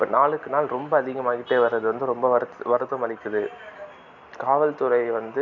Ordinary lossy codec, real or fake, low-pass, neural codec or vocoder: none; real; 7.2 kHz; none